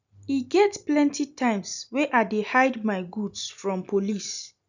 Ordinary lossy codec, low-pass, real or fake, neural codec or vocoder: none; 7.2 kHz; real; none